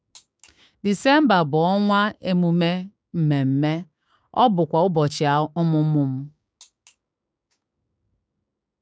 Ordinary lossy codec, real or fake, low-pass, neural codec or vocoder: none; fake; none; codec, 16 kHz, 6 kbps, DAC